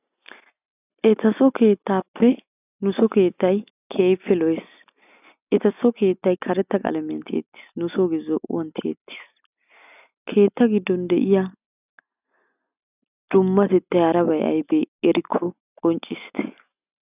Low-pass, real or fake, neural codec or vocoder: 3.6 kHz; real; none